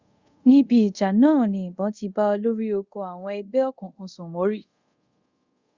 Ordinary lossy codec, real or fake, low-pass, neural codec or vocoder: Opus, 64 kbps; fake; 7.2 kHz; codec, 24 kHz, 0.5 kbps, DualCodec